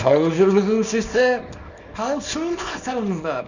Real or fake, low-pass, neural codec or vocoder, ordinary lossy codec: fake; 7.2 kHz; codec, 24 kHz, 0.9 kbps, WavTokenizer, small release; none